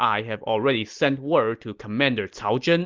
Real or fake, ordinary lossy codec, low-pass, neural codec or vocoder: real; Opus, 32 kbps; 7.2 kHz; none